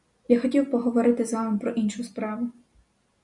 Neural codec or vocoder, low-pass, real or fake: none; 10.8 kHz; real